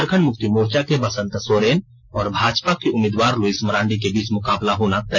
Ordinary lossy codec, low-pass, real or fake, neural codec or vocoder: none; none; real; none